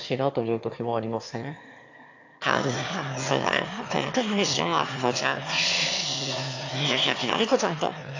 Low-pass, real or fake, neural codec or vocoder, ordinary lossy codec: 7.2 kHz; fake; autoencoder, 22.05 kHz, a latent of 192 numbers a frame, VITS, trained on one speaker; AAC, 48 kbps